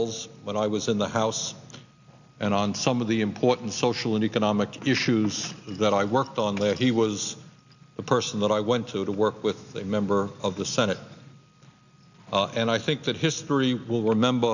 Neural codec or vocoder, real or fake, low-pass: none; real; 7.2 kHz